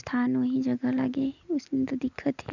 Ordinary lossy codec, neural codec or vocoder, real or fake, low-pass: none; none; real; 7.2 kHz